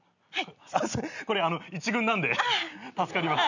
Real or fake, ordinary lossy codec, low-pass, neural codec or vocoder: real; none; 7.2 kHz; none